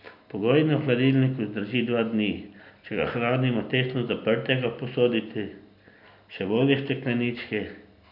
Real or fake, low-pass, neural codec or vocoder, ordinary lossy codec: real; 5.4 kHz; none; none